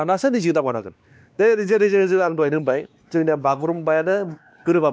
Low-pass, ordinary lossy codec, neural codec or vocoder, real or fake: none; none; codec, 16 kHz, 2 kbps, X-Codec, WavLM features, trained on Multilingual LibriSpeech; fake